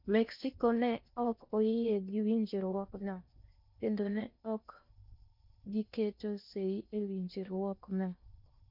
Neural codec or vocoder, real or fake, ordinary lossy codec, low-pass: codec, 16 kHz in and 24 kHz out, 0.6 kbps, FocalCodec, streaming, 4096 codes; fake; MP3, 48 kbps; 5.4 kHz